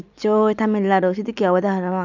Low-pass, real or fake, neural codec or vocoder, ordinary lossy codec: 7.2 kHz; real; none; none